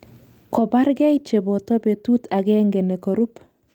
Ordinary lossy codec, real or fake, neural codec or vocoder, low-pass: Opus, 32 kbps; real; none; 19.8 kHz